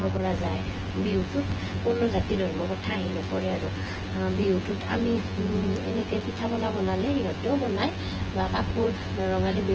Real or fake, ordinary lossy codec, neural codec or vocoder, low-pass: fake; Opus, 16 kbps; vocoder, 24 kHz, 100 mel bands, Vocos; 7.2 kHz